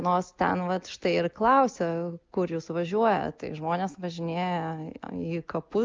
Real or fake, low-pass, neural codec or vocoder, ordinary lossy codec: real; 7.2 kHz; none; Opus, 24 kbps